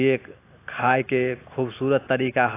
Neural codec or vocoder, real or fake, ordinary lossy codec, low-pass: none; real; AAC, 24 kbps; 3.6 kHz